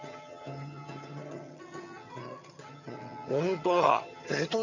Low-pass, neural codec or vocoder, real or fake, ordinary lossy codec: 7.2 kHz; vocoder, 22.05 kHz, 80 mel bands, HiFi-GAN; fake; none